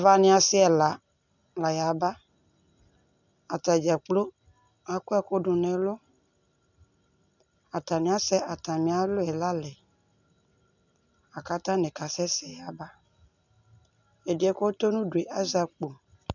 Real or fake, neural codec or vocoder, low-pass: real; none; 7.2 kHz